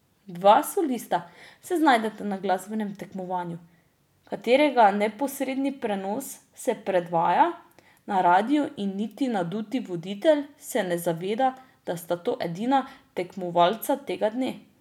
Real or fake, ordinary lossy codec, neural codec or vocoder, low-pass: real; none; none; 19.8 kHz